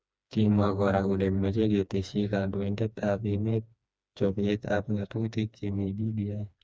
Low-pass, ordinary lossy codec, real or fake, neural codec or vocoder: none; none; fake; codec, 16 kHz, 2 kbps, FreqCodec, smaller model